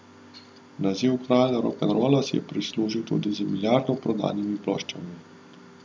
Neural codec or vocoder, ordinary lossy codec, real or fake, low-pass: none; none; real; none